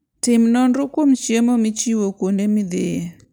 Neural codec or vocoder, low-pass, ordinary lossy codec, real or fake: none; none; none; real